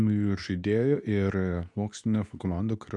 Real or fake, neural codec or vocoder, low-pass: fake; codec, 24 kHz, 0.9 kbps, WavTokenizer, medium speech release version 2; 10.8 kHz